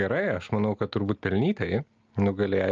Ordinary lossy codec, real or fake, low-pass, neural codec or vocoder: Opus, 24 kbps; real; 7.2 kHz; none